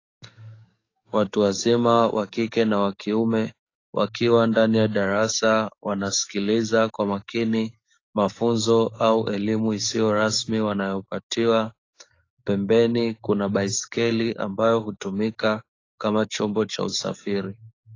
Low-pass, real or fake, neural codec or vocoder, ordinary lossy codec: 7.2 kHz; fake; codec, 44.1 kHz, 7.8 kbps, DAC; AAC, 32 kbps